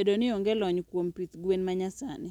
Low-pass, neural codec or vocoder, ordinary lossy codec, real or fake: 19.8 kHz; none; none; real